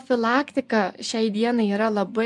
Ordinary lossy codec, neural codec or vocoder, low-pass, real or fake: MP3, 96 kbps; vocoder, 24 kHz, 100 mel bands, Vocos; 10.8 kHz; fake